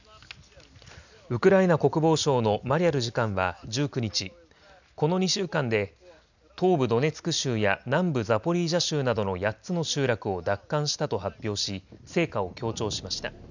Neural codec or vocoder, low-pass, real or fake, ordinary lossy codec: none; 7.2 kHz; real; none